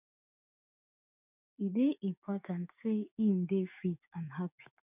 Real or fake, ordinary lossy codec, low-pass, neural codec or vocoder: fake; none; 3.6 kHz; codec, 16 kHz, 6 kbps, DAC